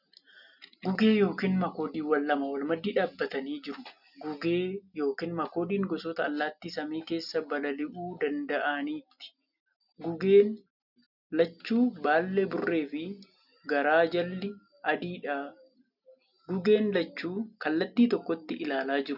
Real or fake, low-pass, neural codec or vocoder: real; 5.4 kHz; none